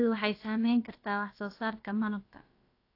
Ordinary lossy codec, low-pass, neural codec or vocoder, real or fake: AAC, 32 kbps; 5.4 kHz; codec, 16 kHz, about 1 kbps, DyCAST, with the encoder's durations; fake